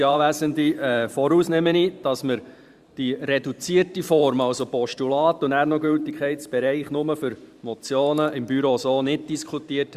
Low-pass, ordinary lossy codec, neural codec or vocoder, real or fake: 14.4 kHz; Opus, 64 kbps; vocoder, 44.1 kHz, 128 mel bands every 512 samples, BigVGAN v2; fake